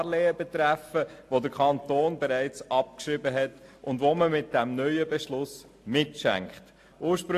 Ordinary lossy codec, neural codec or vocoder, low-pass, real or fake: AAC, 64 kbps; none; 14.4 kHz; real